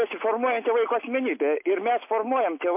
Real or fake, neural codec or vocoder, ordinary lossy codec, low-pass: real; none; MP3, 24 kbps; 3.6 kHz